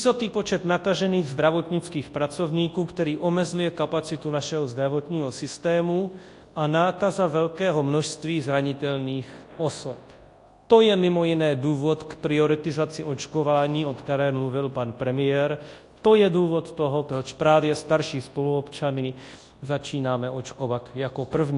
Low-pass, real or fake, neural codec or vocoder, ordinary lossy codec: 10.8 kHz; fake; codec, 24 kHz, 0.9 kbps, WavTokenizer, large speech release; AAC, 48 kbps